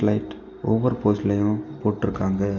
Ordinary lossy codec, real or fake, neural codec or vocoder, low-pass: none; real; none; 7.2 kHz